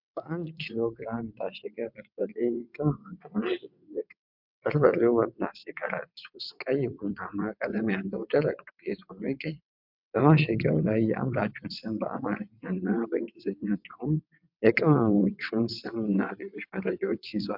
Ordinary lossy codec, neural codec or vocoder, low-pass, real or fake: MP3, 48 kbps; vocoder, 22.05 kHz, 80 mel bands, WaveNeXt; 5.4 kHz; fake